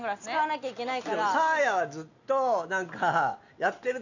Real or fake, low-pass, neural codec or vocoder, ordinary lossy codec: real; 7.2 kHz; none; none